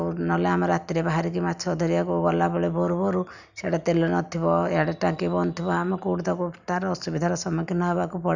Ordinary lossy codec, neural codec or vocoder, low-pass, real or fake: none; none; 7.2 kHz; real